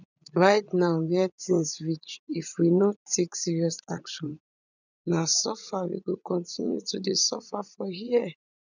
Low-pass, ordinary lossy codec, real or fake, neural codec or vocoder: 7.2 kHz; none; fake; vocoder, 44.1 kHz, 80 mel bands, Vocos